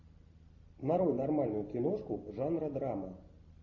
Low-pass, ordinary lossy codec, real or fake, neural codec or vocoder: 7.2 kHz; AAC, 48 kbps; real; none